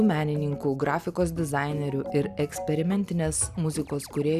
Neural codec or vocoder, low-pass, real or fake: none; 14.4 kHz; real